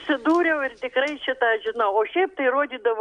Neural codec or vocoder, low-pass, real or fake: none; 9.9 kHz; real